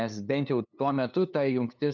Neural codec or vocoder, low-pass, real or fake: codec, 16 kHz, 2 kbps, FunCodec, trained on LibriTTS, 25 frames a second; 7.2 kHz; fake